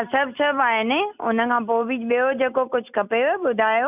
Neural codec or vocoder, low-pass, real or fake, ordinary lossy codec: none; 3.6 kHz; real; none